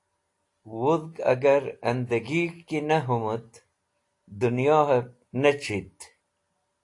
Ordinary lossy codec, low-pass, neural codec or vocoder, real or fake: AAC, 48 kbps; 10.8 kHz; none; real